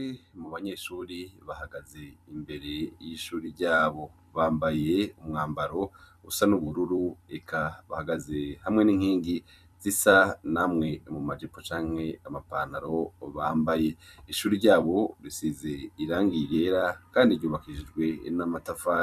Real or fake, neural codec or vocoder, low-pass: fake; vocoder, 44.1 kHz, 128 mel bands every 256 samples, BigVGAN v2; 14.4 kHz